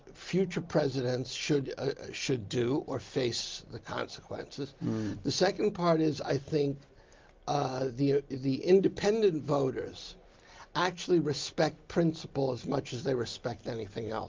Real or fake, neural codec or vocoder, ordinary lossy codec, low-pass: real; none; Opus, 32 kbps; 7.2 kHz